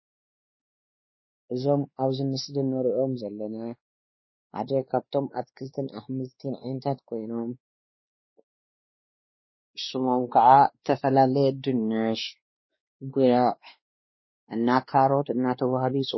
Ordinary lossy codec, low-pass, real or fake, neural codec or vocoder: MP3, 24 kbps; 7.2 kHz; fake; codec, 16 kHz, 2 kbps, X-Codec, WavLM features, trained on Multilingual LibriSpeech